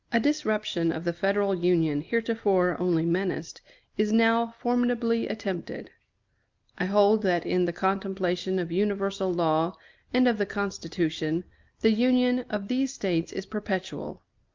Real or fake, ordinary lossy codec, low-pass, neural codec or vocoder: real; Opus, 24 kbps; 7.2 kHz; none